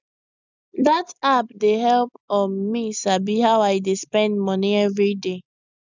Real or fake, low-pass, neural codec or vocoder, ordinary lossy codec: real; 7.2 kHz; none; none